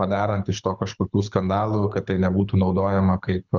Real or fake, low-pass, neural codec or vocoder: fake; 7.2 kHz; codec, 24 kHz, 6 kbps, HILCodec